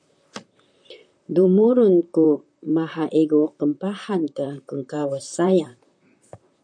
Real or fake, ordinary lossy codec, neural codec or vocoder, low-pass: fake; MP3, 96 kbps; vocoder, 44.1 kHz, 128 mel bands, Pupu-Vocoder; 9.9 kHz